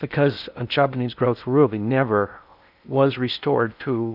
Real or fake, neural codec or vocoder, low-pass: fake; codec, 16 kHz in and 24 kHz out, 0.6 kbps, FocalCodec, streaming, 2048 codes; 5.4 kHz